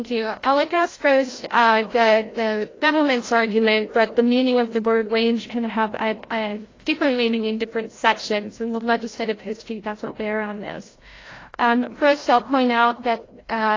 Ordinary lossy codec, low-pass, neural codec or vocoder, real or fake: AAC, 32 kbps; 7.2 kHz; codec, 16 kHz, 0.5 kbps, FreqCodec, larger model; fake